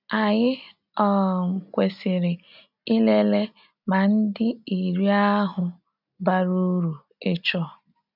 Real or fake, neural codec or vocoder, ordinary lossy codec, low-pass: real; none; none; 5.4 kHz